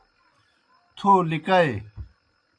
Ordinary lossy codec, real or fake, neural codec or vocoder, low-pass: AAC, 48 kbps; real; none; 9.9 kHz